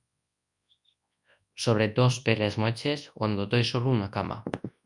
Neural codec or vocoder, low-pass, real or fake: codec, 24 kHz, 0.9 kbps, WavTokenizer, large speech release; 10.8 kHz; fake